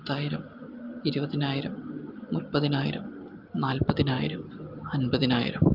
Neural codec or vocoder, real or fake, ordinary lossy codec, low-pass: none; real; Opus, 32 kbps; 5.4 kHz